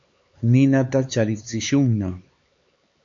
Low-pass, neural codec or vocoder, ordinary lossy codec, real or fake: 7.2 kHz; codec, 16 kHz, 4 kbps, X-Codec, HuBERT features, trained on LibriSpeech; MP3, 48 kbps; fake